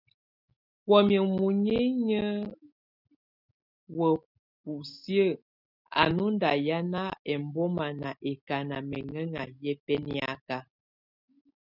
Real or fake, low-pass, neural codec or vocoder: real; 5.4 kHz; none